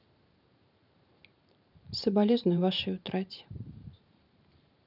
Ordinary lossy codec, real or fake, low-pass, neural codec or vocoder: none; fake; 5.4 kHz; vocoder, 44.1 kHz, 128 mel bands every 512 samples, BigVGAN v2